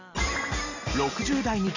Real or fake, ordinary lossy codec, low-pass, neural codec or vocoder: real; none; 7.2 kHz; none